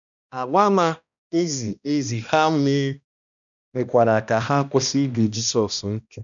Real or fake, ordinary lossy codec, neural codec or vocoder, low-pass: fake; none; codec, 16 kHz, 1 kbps, X-Codec, HuBERT features, trained on balanced general audio; 7.2 kHz